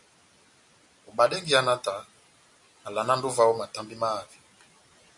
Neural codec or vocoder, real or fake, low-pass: none; real; 10.8 kHz